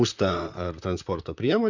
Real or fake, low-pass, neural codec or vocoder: fake; 7.2 kHz; vocoder, 44.1 kHz, 128 mel bands, Pupu-Vocoder